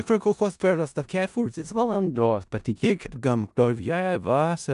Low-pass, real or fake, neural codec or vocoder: 10.8 kHz; fake; codec, 16 kHz in and 24 kHz out, 0.4 kbps, LongCat-Audio-Codec, four codebook decoder